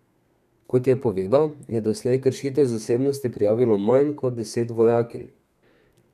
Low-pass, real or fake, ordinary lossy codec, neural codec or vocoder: 14.4 kHz; fake; none; codec, 32 kHz, 1.9 kbps, SNAC